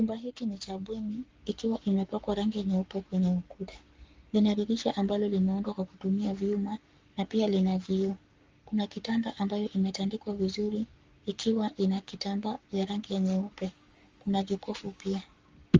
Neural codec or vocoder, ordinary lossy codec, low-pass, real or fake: codec, 44.1 kHz, 7.8 kbps, Pupu-Codec; Opus, 32 kbps; 7.2 kHz; fake